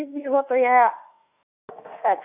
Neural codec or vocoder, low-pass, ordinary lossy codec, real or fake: autoencoder, 48 kHz, 32 numbers a frame, DAC-VAE, trained on Japanese speech; 3.6 kHz; none; fake